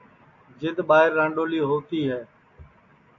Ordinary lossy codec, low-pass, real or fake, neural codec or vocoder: AAC, 48 kbps; 7.2 kHz; real; none